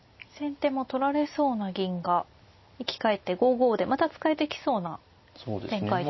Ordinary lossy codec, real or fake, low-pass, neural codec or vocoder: MP3, 24 kbps; real; 7.2 kHz; none